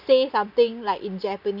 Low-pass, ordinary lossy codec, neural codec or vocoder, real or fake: 5.4 kHz; none; none; real